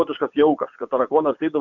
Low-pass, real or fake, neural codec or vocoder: 7.2 kHz; fake; vocoder, 24 kHz, 100 mel bands, Vocos